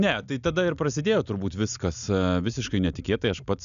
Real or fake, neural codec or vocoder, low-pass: real; none; 7.2 kHz